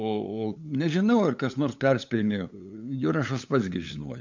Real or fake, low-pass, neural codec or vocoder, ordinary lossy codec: fake; 7.2 kHz; codec, 16 kHz, 8 kbps, FunCodec, trained on LibriTTS, 25 frames a second; MP3, 64 kbps